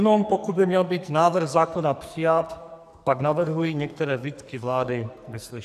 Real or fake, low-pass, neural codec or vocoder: fake; 14.4 kHz; codec, 44.1 kHz, 2.6 kbps, SNAC